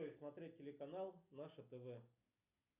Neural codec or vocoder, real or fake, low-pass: none; real; 3.6 kHz